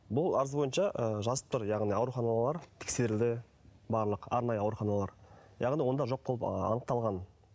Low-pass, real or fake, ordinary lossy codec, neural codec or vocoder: none; real; none; none